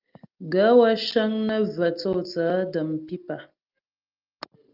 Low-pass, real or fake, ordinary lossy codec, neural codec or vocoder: 5.4 kHz; real; Opus, 32 kbps; none